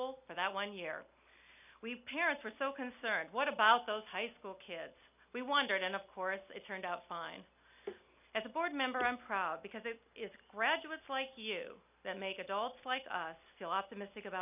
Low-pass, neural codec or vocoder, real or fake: 3.6 kHz; none; real